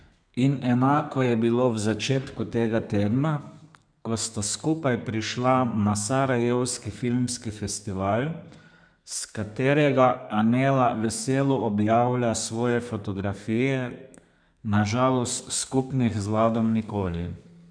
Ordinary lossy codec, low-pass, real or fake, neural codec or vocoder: none; 9.9 kHz; fake; codec, 32 kHz, 1.9 kbps, SNAC